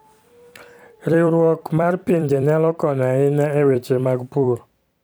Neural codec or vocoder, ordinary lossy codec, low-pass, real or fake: vocoder, 44.1 kHz, 128 mel bands every 256 samples, BigVGAN v2; none; none; fake